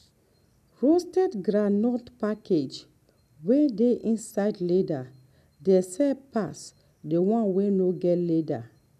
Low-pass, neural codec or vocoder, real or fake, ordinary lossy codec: 14.4 kHz; none; real; none